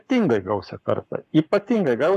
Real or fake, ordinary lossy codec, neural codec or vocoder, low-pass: fake; AAC, 96 kbps; codec, 44.1 kHz, 7.8 kbps, Pupu-Codec; 14.4 kHz